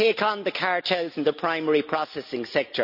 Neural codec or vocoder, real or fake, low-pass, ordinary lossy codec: none; real; 5.4 kHz; none